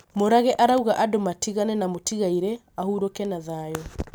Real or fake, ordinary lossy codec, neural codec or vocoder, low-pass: real; none; none; none